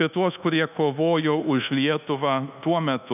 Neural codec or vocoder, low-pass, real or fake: codec, 24 kHz, 1.2 kbps, DualCodec; 3.6 kHz; fake